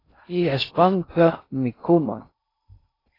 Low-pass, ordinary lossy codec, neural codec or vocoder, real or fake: 5.4 kHz; AAC, 24 kbps; codec, 16 kHz in and 24 kHz out, 0.6 kbps, FocalCodec, streaming, 2048 codes; fake